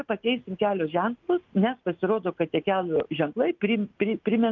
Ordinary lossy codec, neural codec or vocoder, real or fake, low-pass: Opus, 24 kbps; none; real; 7.2 kHz